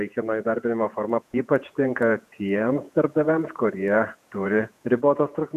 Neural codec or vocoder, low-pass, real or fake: vocoder, 48 kHz, 128 mel bands, Vocos; 14.4 kHz; fake